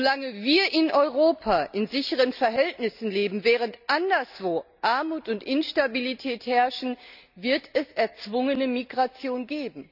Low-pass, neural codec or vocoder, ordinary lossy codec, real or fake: 5.4 kHz; none; none; real